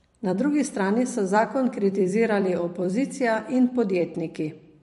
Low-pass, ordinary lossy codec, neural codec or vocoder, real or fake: 14.4 kHz; MP3, 48 kbps; vocoder, 44.1 kHz, 128 mel bands every 256 samples, BigVGAN v2; fake